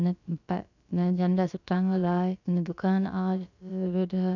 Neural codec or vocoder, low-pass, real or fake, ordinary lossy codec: codec, 16 kHz, about 1 kbps, DyCAST, with the encoder's durations; 7.2 kHz; fake; none